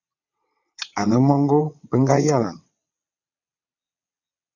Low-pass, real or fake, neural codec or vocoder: 7.2 kHz; fake; vocoder, 22.05 kHz, 80 mel bands, WaveNeXt